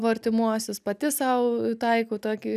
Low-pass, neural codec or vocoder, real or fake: 14.4 kHz; none; real